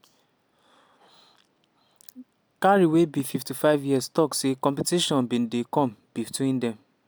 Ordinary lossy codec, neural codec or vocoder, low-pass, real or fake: none; none; none; real